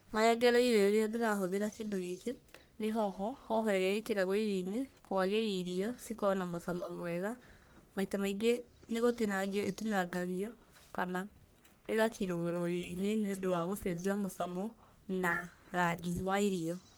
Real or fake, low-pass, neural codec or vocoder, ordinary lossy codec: fake; none; codec, 44.1 kHz, 1.7 kbps, Pupu-Codec; none